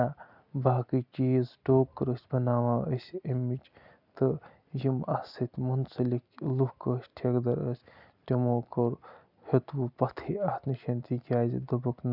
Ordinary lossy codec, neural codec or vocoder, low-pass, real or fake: none; none; 5.4 kHz; real